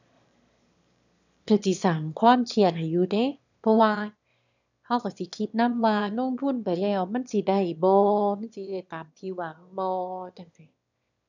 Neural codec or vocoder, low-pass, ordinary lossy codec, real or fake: autoencoder, 22.05 kHz, a latent of 192 numbers a frame, VITS, trained on one speaker; 7.2 kHz; none; fake